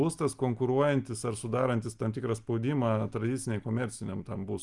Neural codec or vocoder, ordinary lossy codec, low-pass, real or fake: none; Opus, 32 kbps; 10.8 kHz; real